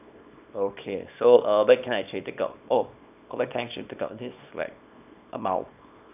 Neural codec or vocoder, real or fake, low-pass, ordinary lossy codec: codec, 24 kHz, 0.9 kbps, WavTokenizer, small release; fake; 3.6 kHz; none